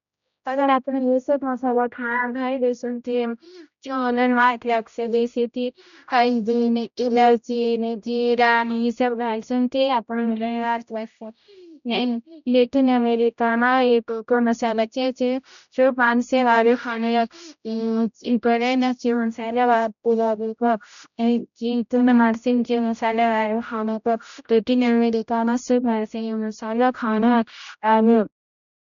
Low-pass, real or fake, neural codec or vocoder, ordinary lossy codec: 7.2 kHz; fake; codec, 16 kHz, 0.5 kbps, X-Codec, HuBERT features, trained on general audio; none